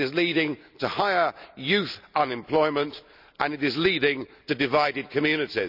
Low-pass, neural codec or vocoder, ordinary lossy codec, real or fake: 5.4 kHz; none; none; real